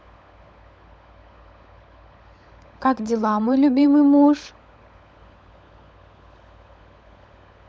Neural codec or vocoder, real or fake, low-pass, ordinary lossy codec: codec, 16 kHz, 16 kbps, FunCodec, trained on LibriTTS, 50 frames a second; fake; none; none